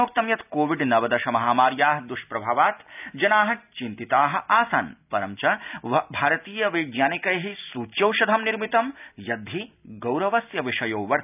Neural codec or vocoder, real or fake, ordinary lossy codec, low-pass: none; real; none; 3.6 kHz